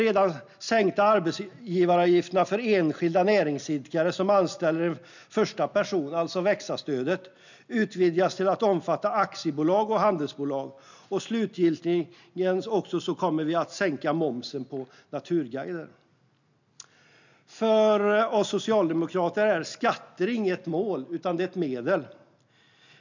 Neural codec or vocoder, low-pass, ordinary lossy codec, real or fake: none; 7.2 kHz; none; real